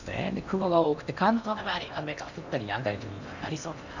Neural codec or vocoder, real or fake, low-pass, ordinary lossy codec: codec, 16 kHz in and 24 kHz out, 0.8 kbps, FocalCodec, streaming, 65536 codes; fake; 7.2 kHz; none